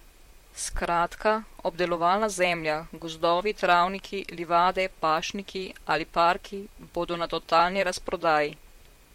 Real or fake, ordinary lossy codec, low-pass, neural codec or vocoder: fake; MP3, 64 kbps; 19.8 kHz; vocoder, 44.1 kHz, 128 mel bands, Pupu-Vocoder